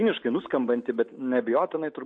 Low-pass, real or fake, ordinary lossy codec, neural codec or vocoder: 9.9 kHz; real; MP3, 48 kbps; none